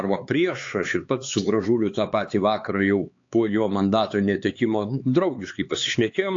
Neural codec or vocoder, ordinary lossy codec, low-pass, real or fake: codec, 16 kHz, 4 kbps, X-Codec, HuBERT features, trained on LibriSpeech; AAC, 48 kbps; 7.2 kHz; fake